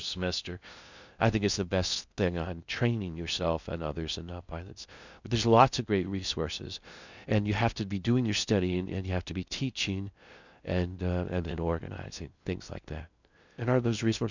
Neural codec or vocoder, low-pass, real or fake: codec, 16 kHz in and 24 kHz out, 0.6 kbps, FocalCodec, streaming, 2048 codes; 7.2 kHz; fake